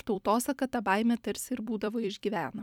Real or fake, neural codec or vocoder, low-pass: fake; vocoder, 44.1 kHz, 128 mel bands every 256 samples, BigVGAN v2; 19.8 kHz